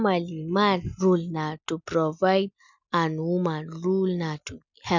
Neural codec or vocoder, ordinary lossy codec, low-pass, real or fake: none; none; 7.2 kHz; real